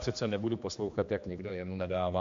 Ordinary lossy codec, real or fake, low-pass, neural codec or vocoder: MP3, 48 kbps; fake; 7.2 kHz; codec, 16 kHz, 2 kbps, X-Codec, HuBERT features, trained on general audio